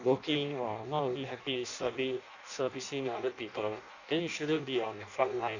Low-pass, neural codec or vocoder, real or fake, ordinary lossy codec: 7.2 kHz; codec, 16 kHz in and 24 kHz out, 0.6 kbps, FireRedTTS-2 codec; fake; none